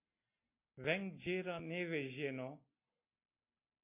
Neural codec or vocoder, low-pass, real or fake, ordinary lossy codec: none; 3.6 kHz; real; MP3, 24 kbps